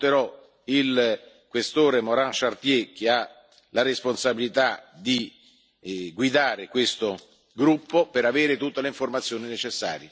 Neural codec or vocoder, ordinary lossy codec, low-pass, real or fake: none; none; none; real